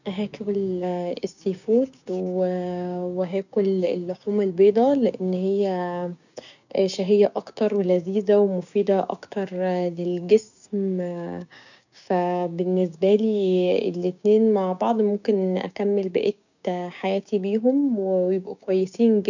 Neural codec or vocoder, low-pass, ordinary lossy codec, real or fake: codec, 16 kHz, 6 kbps, DAC; 7.2 kHz; none; fake